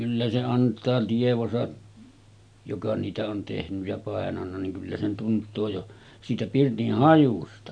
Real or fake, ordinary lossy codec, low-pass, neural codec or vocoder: real; none; 9.9 kHz; none